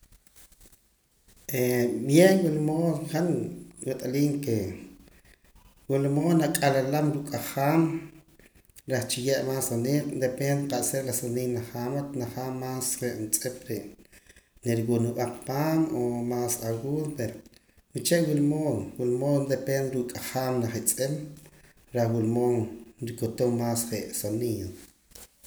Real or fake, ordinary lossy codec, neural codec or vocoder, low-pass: real; none; none; none